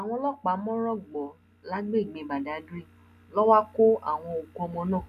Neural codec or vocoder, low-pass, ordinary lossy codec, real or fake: none; 14.4 kHz; none; real